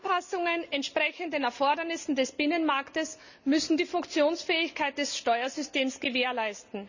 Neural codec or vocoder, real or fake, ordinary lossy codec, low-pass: none; real; none; 7.2 kHz